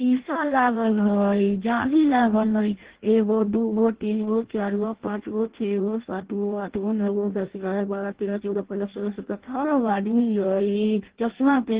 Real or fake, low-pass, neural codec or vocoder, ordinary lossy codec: fake; 3.6 kHz; codec, 16 kHz in and 24 kHz out, 0.6 kbps, FireRedTTS-2 codec; Opus, 16 kbps